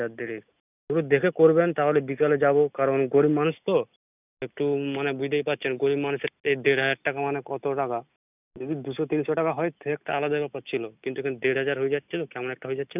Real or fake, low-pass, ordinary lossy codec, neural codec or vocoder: real; 3.6 kHz; none; none